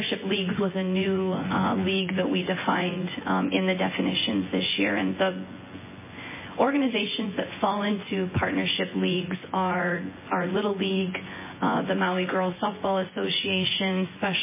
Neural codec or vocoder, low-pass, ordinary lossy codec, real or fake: vocoder, 24 kHz, 100 mel bands, Vocos; 3.6 kHz; MP3, 16 kbps; fake